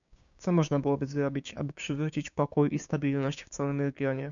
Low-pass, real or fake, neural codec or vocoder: 7.2 kHz; fake; codec, 16 kHz, 6 kbps, DAC